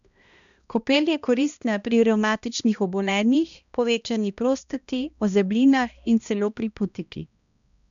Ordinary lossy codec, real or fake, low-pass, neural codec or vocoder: none; fake; 7.2 kHz; codec, 16 kHz, 1 kbps, X-Codec, HuBERT features, trained on balanced general audio